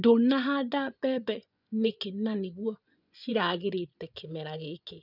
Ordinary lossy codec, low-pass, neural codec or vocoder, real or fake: none; 5.4 kHz; vocoder, 24 kHz, 100 mel bands, Vocos; fake